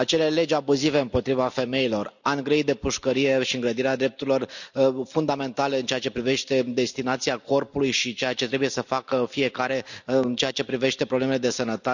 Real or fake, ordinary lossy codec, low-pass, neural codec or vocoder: real; none; 7.2 kHz; none